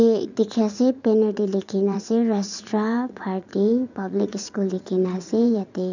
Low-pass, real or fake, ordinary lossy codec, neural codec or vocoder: 7.2 kHz; fake; none; vocoder, 44.1 kHz, 80 mel bands, Vocos